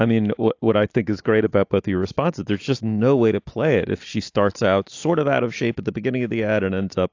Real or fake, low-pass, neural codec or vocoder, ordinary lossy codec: real; 7.2 kHz; none; AAC, 48 kbps